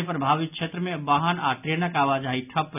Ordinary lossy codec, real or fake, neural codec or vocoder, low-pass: none; real; none; 3.6 kHz